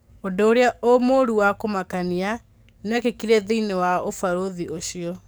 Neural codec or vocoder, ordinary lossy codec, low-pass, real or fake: codec, 44.1 kHz, 7.8 kbps, DAC; none; none; fake